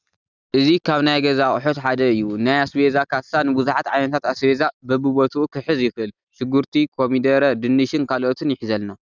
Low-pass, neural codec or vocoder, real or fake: 7.2 kHz; none; real